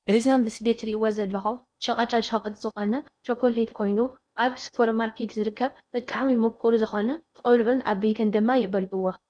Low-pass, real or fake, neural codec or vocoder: 9.9 kHz; fake; codec, 16 kHz in and 24 kHz out, 0.6 kbps, FocalCodec, streaming, 4096 codes